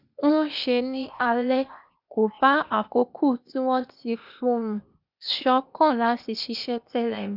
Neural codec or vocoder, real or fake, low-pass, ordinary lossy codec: codec, 16 kHz, 0.8 kbps, ZipCodec; fake; 5.4 kHz; none